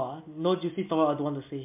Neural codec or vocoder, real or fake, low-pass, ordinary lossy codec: none; real; 3.6 kHz; MP3, 32 kbps